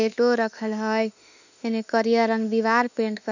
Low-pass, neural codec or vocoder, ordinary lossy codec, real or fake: 7.2 kHz; autoencoder, 48 kHz, 32 numbers a frame, DAC-VAE, trained on Japanese speech; none; fake